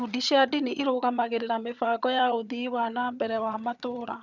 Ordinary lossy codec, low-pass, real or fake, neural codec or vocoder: none; 7.2 kHz; fake; vocoder, 22.05 kHz, 80 mel bands, HiFi-GAN